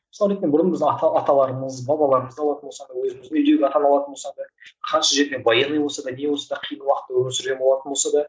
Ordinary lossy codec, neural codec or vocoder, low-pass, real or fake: none; none; none; real